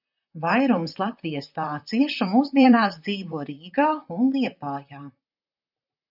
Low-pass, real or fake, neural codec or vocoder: 5.4 kHz; fake; vocoder, 44.1 kHz, 128 mel bands, Pupu-Vocoder